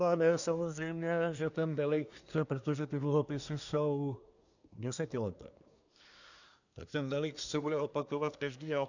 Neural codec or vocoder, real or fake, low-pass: codec, 24 kHz, 1 kbps, SNAC; fake; 7.2 kHz